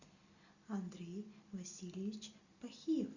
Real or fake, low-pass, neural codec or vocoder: real; 7.2 kHz; none